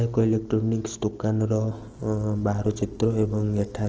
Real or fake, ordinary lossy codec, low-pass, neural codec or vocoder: real; Opus, 16 kbps; 7.2 kHz; none